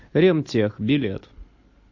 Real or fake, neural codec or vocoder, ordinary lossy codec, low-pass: real; none; AAC, 48 kbps; 7.2 kHz